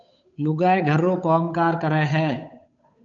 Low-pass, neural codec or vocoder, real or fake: 7.2 kHz; codec, 16 kHz, 8 kbps, FunCodec, trained on Chinese and English, 25 frames a second; fake